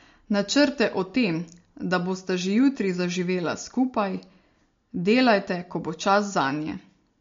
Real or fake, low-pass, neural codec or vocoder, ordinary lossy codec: real; 7.2 kHz; none; MP3, 48 kbps